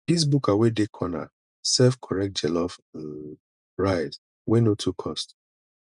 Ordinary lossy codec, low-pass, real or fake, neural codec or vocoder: none; 10.8 kHz; fake; vocoder, 48 kHz, 128 mel bands, Vocos